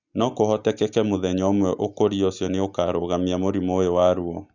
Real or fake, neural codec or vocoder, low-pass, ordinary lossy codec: real; none; 7.2 kHz; Opus, 64 kbps